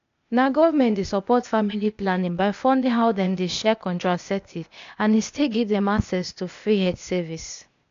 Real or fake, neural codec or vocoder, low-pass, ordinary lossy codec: fake; codec, 16 kHz, 0.8 kbps, ZipCodec; 7.2 kHz; AAC, 64 kbps